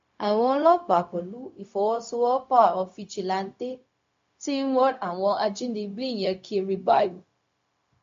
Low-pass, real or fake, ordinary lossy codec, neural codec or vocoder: 7.2 kHz; fake; MP3, 48 kbps; codec, 16 kHz, 0.4 kbps, LongCat-Audio-Codec